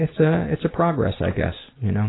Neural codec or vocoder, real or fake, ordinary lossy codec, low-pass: none; real; AAC, 16 kbps; 7.2 kHz